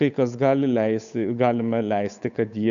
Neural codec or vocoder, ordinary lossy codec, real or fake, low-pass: codec, 16 kHz, 8 kbps, FunCodec, trained on Chinese and English, 25 frames a second; MP3, 96 kbps; fake; 7.2 kHz